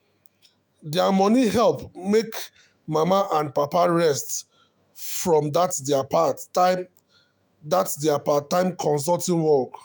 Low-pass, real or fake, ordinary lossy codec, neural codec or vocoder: none; fake; none; autoencoder, 48 kHz, 128 numbers a frame, DAC-VAE, trained on Japanese speech